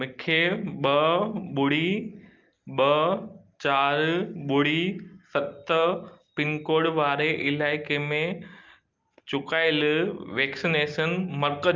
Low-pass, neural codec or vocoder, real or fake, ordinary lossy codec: 7.2 kHz; none; real; Opus, 24 kbps